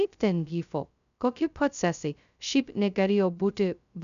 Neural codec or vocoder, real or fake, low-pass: codec, 16 kHz, 0.2 kbps, FocalCodec; fake; 7.2 kHz